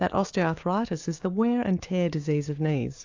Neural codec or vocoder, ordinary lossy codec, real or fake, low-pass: codec, 16 kHz, 6 kbps, DAC; AAC, 48 kbps; fake; 7.2 kHz